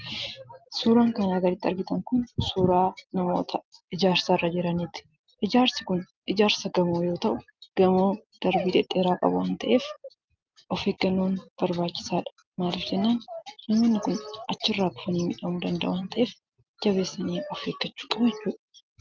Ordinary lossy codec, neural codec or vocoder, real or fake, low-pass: Opus, 24 kbps; none; real; 7.2 kHz